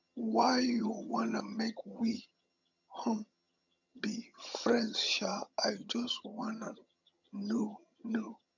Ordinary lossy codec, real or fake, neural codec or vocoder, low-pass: none; fake; vocoder, 22.05 kHz, 80 mel bands, HiFi-GAN; 7.2 kHz